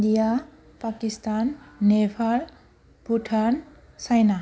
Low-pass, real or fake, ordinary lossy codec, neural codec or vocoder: none; real; none; none